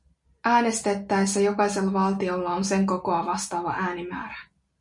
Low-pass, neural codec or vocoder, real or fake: 10.8 kHz; none; real